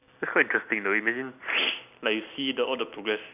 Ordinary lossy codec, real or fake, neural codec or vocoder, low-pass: none; fake; vocoder, 44.1 kHz, 128 mel bands every 256 samples, BigVGAN v2; 3.6 kHz